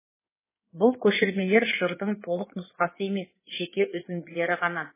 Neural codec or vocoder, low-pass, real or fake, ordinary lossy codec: codec, 16 kHz in and 24 kHz out, 2.2 kbps, FireRedTTS-2 codec; 3.6 kHz; fake; MP3, 16 kbps